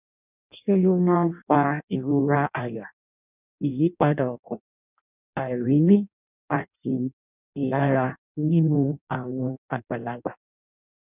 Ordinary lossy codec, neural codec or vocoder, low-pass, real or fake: none; codec, 16 kHz in and 24 kHz out, 0.6 kbps, FireRedTTS-2 codec; 3.6 kHz; fake